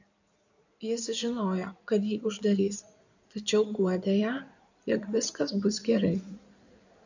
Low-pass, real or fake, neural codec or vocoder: 7.2 kHz; fake; codec, 16 kHz in and 24 kHz out, 2.2 kbps, FireRedTTS-2 codec